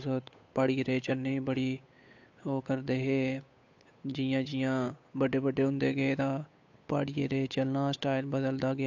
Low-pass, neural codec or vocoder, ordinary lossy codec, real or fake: 7.2 kHz; codec, 16 kHz, 8 kbps, FunCodec, trained on Chinese and English, 25 frames a second; AAC, 48 kbps; fake